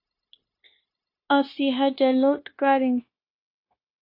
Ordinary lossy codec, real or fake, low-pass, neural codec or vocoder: AAC, 32 kbps; fake; 5.4 kHz; codec, 16 kHz, 0.9 kbps, LongCat-Audio-Codec